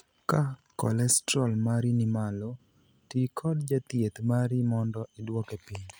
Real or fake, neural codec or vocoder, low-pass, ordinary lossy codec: real; none; none; none